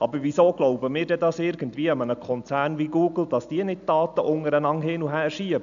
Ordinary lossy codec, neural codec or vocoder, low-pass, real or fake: none; none; 7.2 kHz; real